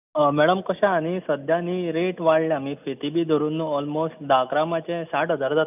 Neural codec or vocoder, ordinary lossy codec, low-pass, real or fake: none; none; 3.6 kHz; real